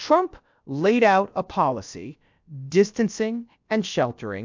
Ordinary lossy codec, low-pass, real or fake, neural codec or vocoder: MP3, 64 kbps; 7.2 kHz; fake; codec, 16 kHz, about 1 kbps, DyCAST, with the encoder's durations